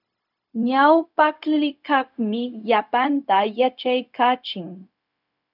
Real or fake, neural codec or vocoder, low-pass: fake; codec, 16 kHz, 0.4 kbps, LongCat-Audio-Codec; 5.4 kHz